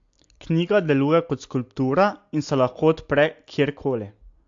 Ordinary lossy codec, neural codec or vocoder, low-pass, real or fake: AAC, 64 kbps; none; 7.2 kHz; real